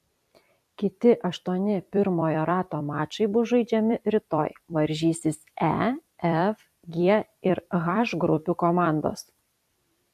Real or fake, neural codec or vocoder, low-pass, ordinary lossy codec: fake; vocoder, 44.1 kHz, 128 mel bands every 256 samples, BigVGAN v2; 14.4 kHz; AAC, 96 kbps